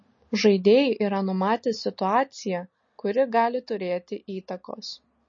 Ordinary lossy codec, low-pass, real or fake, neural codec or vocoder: MP3, 32 kbps; 7.2 kHz; real; none